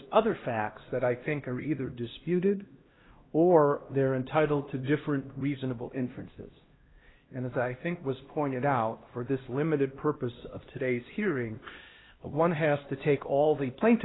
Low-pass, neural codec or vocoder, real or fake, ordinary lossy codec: 7.2 kHz; codec, 16 kHz, 1 kbps, X-Codec, HuBERT features, trained on LibriSpeech; fake; AAC, 16 kbps